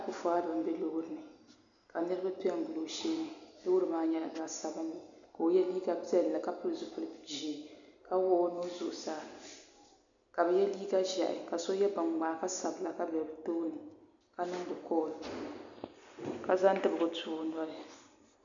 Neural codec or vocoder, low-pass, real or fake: none; 7.2 kHz; real